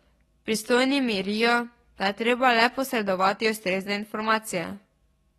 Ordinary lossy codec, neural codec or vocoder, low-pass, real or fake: AAC, 32 kbps; codec, 44.1 kHz, 7.8 kbps, DAC; 19.8 kHz; fake